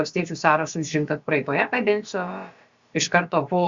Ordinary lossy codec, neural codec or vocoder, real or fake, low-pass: Opus, 64 kbps; codec, 16 kHz, about 1 kbps, DyCAST, with the encoder's durations; fake; 7.2 kHz